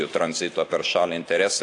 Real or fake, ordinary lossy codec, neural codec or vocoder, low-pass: real; AAC, 48 kbps; none; 10.8 kHz